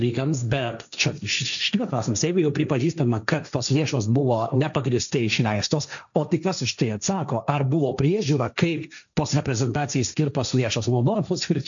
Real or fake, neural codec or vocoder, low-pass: fake; codec, 16 kHz, 1.1 kbps, Voila-Tokenizer; 7.2 kHz